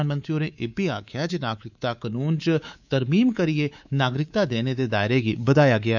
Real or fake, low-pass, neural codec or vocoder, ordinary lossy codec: fake; 7.2 kHz; codec, 24 kHz, 3.1 kbps, DualCodec; none